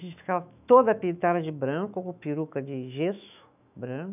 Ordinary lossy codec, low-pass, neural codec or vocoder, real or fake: none; 3.6 kHz; autoencoder, 48 kHz, 128 numbers a frame, DAC-VAE, trained on Japanese speech; fake